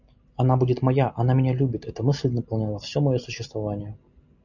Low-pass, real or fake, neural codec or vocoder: 7.2 kHz; real; none